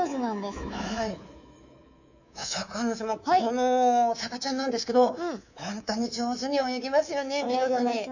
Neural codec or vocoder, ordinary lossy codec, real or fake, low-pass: codec, 24 kHz, 3.1 kbps, DualCodec; none; fake; 7.2 kHz